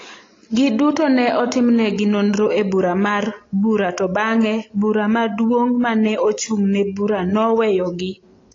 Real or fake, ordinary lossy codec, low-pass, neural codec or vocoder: real; AAC, 32 kbps; 7.2 kHz; none